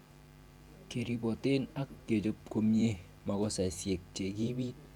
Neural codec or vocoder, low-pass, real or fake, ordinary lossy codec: vocoder, 44.1 kHz, 128 mel bands every 512 samples, BigVGAN v2; 19.8 kHz; fake; none